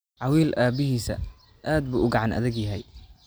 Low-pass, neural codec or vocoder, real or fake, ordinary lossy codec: none; none; real; none